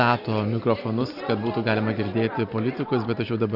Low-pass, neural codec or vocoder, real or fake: 5.4 kHz; none; real